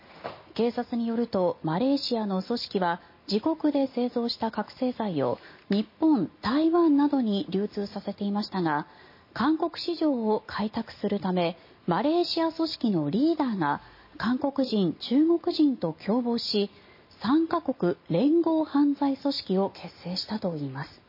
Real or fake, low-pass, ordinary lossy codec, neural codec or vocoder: real; 5.4 kHz; MP3, 24 kbps; none